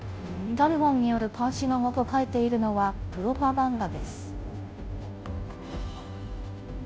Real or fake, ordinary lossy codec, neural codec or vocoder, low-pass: fake; none; codec, 16 kHz, 0.5 kbps, FunCodec, trained on Chinese and English, 25 frames a second; none